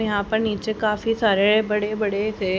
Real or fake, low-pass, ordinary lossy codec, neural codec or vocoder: real; none; none; none